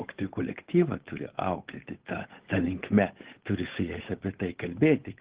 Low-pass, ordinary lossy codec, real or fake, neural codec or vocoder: 3.6 kHz; Opus, 16 kbps; fake; codec, 16 kHz, 8 kbps, FunCodec, trained on Chinese and English, 25 frames a second